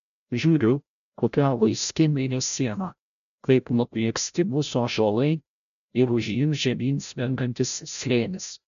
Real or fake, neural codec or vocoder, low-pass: fake; codec, 16 kHz, 0.5 kbps, FreqCodec, larger model; 7.2 kHz